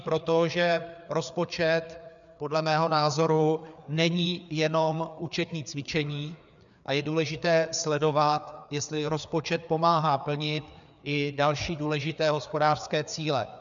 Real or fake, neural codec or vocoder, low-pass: fake; codec, 16 kHz, 4 kbps, FreqCodec, larger model; 7.2 kHz